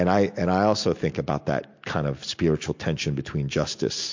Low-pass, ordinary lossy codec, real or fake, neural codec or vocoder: 7.2 kHz; MP3, 48 kbps; real; none